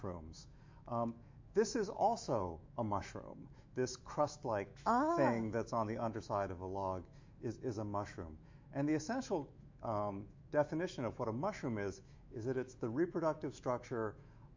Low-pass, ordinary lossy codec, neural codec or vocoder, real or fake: 7.2 kHz; MP3, 48 kbps; autoencoder, 48 kHz, 128 numbers a frame, DAC-VAE, trained on Japanese speech; fake